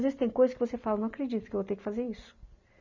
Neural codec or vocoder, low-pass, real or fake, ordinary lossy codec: none; 7.2 kHz; real; none